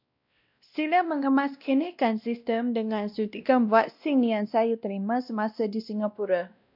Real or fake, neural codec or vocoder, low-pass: fake; codec, 16 kHz, 1 kbps, X-Codec, WavLM features, trained on Multilingual LibriSpeech; 5.4 kHz